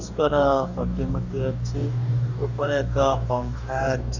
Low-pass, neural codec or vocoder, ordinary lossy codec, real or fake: 7.2 kHz; codec, 44.1 kHz, 2.6 kbps, DAC; none; fake